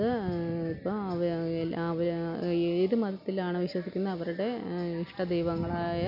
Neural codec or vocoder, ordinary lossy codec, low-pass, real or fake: none; none; 5.4 kHz; real